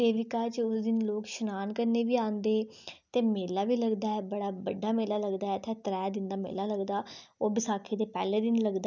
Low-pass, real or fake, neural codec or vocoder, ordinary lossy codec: 7.2 kHz; real; none; none